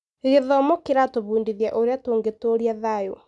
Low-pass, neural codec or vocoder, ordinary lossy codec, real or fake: 10.8 kHz; none; none; real